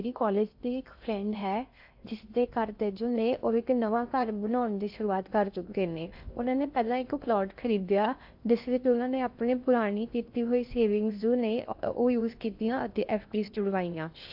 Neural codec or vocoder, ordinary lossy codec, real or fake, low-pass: codec, 16 kHz in and 24 kHz out, 0.6 kbps, FocalCodec, streaming, 2048 codes; AAC, 48 kbps; fake; 5.4 kHz